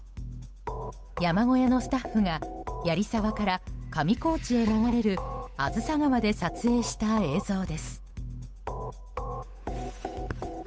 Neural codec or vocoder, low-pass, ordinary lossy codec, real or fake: codec, 16 kHz, 8 kbps, FunCodec, trained on Chinese and English, 25 frames a second; none; none; fake